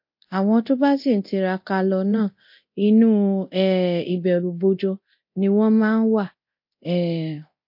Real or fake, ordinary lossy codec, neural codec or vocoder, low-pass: fake; MP3, 32 kbps; codec, 24 kHz, 0.9 kbps, DualCodec; 5.4 kHz